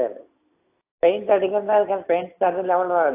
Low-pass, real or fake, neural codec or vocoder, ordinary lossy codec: 3.6 kHz; real; none; AAC, 24 kbps